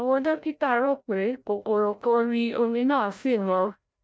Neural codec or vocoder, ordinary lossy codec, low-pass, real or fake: codec, 16 kHz, 0.5 kbps, FreqCodec, larger model; none; none; fake